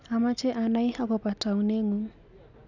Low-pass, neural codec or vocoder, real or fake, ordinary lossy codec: 7.2 kHz; none; real; none